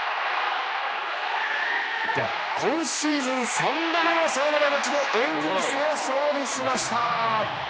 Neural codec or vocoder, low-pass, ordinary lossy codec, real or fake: codec, 16 kHz, 2 kbps, X-Codec, HuBERT features, trained on general audio; none; none; fake